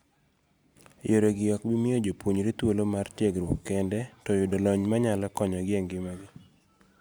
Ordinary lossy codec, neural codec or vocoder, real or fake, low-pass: none; none; real; none